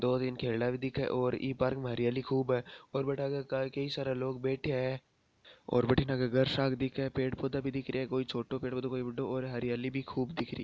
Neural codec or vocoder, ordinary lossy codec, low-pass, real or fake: none; none; none; real